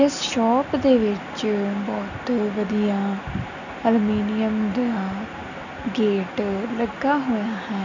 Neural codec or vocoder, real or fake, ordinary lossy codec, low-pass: none; real; none; 7.2 kHz